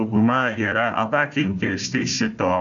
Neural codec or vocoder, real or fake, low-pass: codec, 16 kHz, 1 kbps, FunCodec, trained on Chinese and English, 50 frames a second; fake; 7.2 kHz